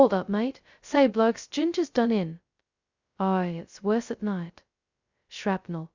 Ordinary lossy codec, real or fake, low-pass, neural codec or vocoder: Opus, 64 kbps; fake; 7.2 kHz; codec, 16 kHz, 0.2 kbps, FocalCodec